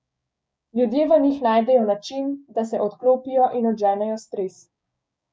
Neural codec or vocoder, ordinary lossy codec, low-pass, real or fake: codec, 16 kHz, 6 kbps, DAC; none; none; fake